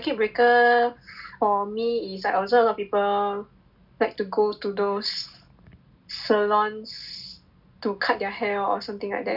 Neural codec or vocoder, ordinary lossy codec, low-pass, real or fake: none; none; 5.4 kHz; real